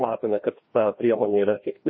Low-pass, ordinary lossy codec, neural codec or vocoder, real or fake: 7.2 kHz; MP3, 24 kbps; codec, 16 kHz, 1 kbps, FreqCodec, larger model; fake